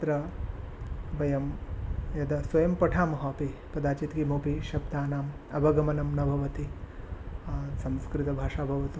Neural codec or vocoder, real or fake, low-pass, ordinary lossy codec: none; real; none; none